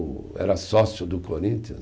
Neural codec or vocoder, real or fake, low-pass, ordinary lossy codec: none; real; none; none